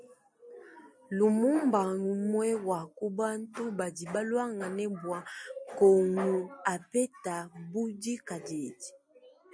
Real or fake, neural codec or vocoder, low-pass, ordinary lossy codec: real; none; 9.9 kHz; MP3, 64 kbps